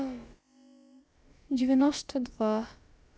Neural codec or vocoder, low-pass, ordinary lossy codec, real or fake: codec, 16 kHz, about 1 kbps, DyCAST, with the encoder's durations; none; none; fake